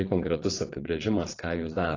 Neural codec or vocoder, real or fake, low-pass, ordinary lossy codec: vocoder, 22.05 kHz, 80 mel bands, WaveNeXt; fake; 7.2 kHz; AAC, 32 kbps